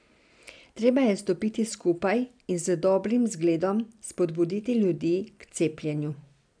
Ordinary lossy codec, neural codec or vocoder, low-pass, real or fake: none; none; 9.9 kHz; real